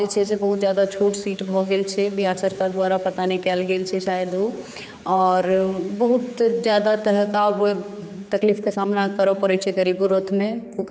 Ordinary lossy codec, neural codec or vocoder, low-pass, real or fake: none; codec, 16 kHz, 4 kbps, X-Codec, HuBERT features, trained on general audio; none; fake